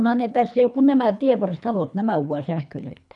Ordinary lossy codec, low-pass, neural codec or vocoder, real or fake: none; 10.8 kHz; codec, 24 kHz, 3 kbps, HILCodec; fake